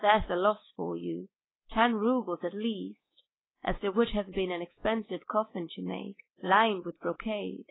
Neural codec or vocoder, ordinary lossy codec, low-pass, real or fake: codec, 24 kHz, 3.1 kbps, DualCodec; AAC, 16 kbps; 7.2 kHz; fake